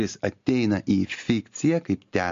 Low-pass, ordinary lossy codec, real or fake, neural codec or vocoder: 7.2 kHz; MP3, 48 kbps; real; none